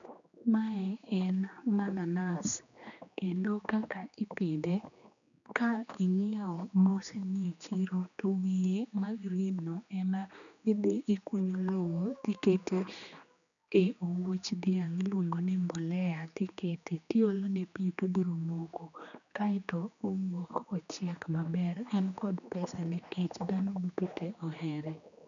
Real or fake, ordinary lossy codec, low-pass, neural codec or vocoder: fake; none; 7.2 kHz; codec, 16 kHz, 2 kbps, X-Codec, HuBERT features, trained on general audio